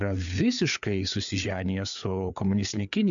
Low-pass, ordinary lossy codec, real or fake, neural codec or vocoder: 7.2 kHz; MP3, 64 kbps; fake; codec, 16 kHz, 4 kbps, FreqCodec, larger model